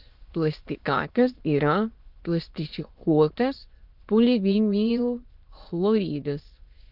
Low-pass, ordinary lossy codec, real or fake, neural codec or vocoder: 5.4 kHz; Opus, 24 kbps; fake; autoencoder, 22.05 kHz, a latent of 192 numbers a frame, VITS, trained on many speakers